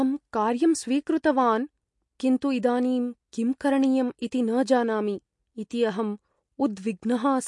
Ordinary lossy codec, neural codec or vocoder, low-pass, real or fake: MP3, 48 kbps; none; 10.8 kHz; real